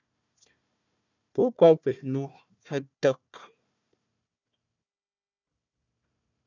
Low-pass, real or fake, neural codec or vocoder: 7.2 kHz; fake; codec, 16 kHz, 1 kbps, FunCodec, trained on Chinese and English, 50 frames a second